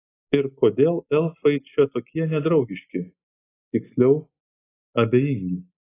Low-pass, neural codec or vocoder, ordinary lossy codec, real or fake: 3.6 kHz; none; AAC, 16 kbps; real